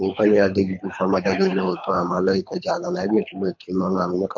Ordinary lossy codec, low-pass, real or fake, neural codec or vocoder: MP3, 48 kbps; 7.2 kHz; fake; codec, 24 kHz, 3 kbps, HILCodec